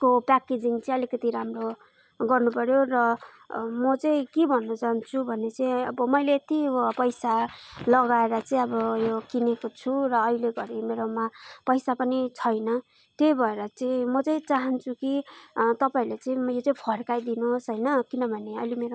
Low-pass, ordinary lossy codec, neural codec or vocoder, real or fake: none; none; none; real